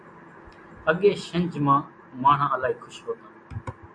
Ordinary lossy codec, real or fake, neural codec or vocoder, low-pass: AAC, 64 kbps; real; none; 9.9 kHz